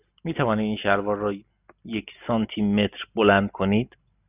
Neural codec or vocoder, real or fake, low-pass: none; real; 3.6 kHz